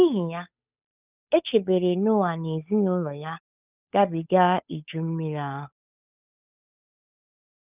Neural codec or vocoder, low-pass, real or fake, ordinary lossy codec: codec, 16 kHz, 8 kbps, FunCodec, trained on Chinese and English, 25 frames a second; 3.6 kHz; fake; none